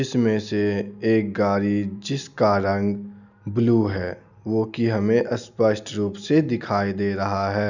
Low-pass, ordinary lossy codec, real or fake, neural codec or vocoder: 7.2 kHz; none; real; none